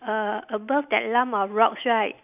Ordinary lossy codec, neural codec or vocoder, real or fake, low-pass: none; none; real; 3.6 kHz